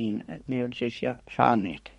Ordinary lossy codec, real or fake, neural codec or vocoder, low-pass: MP3, 48 kbps; fake; codec, 24 kHz, 1 kbps, SNAC; 10.8 kHz